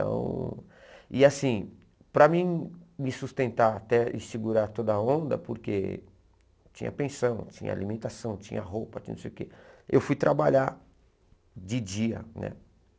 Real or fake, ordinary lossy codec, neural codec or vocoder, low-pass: real; none; none; none